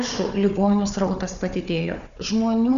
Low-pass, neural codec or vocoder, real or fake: 7.2 kHz; codec, 16 kHz, 4 kbps, FunCodec, trained on Chinese and English, 50 frames a second; fake